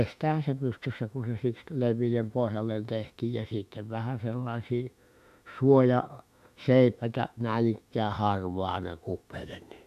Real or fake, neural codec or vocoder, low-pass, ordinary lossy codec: fake; autoencoder, 48 kHz, 32 numbers a frame, DAC-VAE, trained on Japanese speech; 14.4 kHz; none